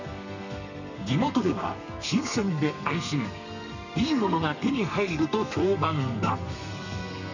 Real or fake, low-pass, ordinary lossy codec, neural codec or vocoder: fake; 7.2 kHz; none; codec, 32 kHz, 1.9 kbps, SNAC